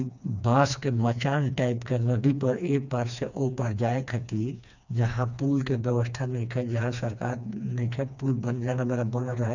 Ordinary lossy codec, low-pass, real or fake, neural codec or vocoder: none; 7.2 kHz; fake; codec, 16 kHz, 2 kbps, FreqCodec, smaller model